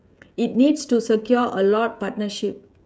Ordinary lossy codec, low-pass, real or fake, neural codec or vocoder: none; none; fake; codec, 16 kHz, 16 kbps, FreqCodec, smaller model